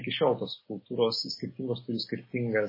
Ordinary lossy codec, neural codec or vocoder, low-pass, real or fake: MP3, 24 kbps; none; 5.4 kHz; real